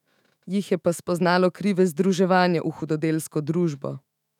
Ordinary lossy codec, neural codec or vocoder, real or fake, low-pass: none; autoencoder, 48 kHz, 128 numbers a frame, DAC-VAE, trained on Japanese speech; fake; 19.8 kHz